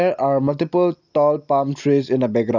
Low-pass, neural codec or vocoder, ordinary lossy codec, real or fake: 7.2 kHz; none; none; real